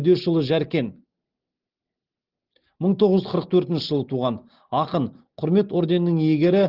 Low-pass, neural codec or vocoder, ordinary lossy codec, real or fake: 5.4 kHz; none; Opus, 16 kbps; real